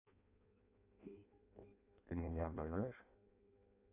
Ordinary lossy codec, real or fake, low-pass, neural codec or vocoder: AAC, 24 kbps; fake; 3.6 kHz; codec, 16 kHz in and 24 kHz out, 0.6 kbps, FireRedTTS-2 codec